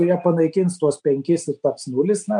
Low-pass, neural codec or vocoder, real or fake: 9.9 kHz; none; real